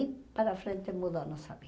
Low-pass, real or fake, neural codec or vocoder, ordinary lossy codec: none; real; none; none